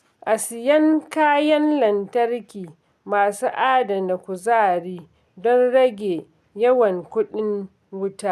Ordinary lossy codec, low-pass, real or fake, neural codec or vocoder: none; 14.4 kHz; real; none